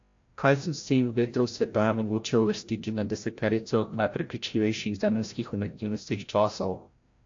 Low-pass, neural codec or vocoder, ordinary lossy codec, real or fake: 7.2 kHz; codec, 16 kHz, 0.5 kbps, FreqCodec, larger model; AAC, 48 kbps; fake